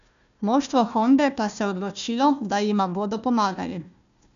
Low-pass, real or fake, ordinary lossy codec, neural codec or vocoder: 7.2 kHz; fake; none; codec, 16 kHz, 1 kbps, FunCodec, trained on Chinese and English, 50 frames a second